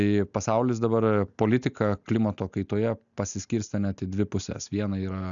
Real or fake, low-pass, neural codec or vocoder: real; 7.2 kHz; none